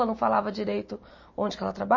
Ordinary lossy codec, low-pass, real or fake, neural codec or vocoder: MP3, 32 kbps; 7.2 kHz; real; none